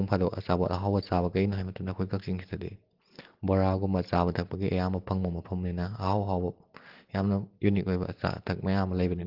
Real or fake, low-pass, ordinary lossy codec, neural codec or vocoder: real; 5.4 kHz; Opus, 16 kbps; none